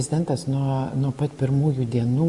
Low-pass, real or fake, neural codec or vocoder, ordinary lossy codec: 10.8 kHz; real; none; Opus, 64 kbps